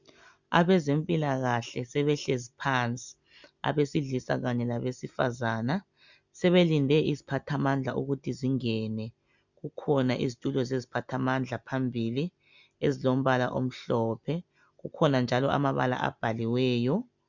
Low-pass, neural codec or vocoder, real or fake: 7.2 kHz; none; real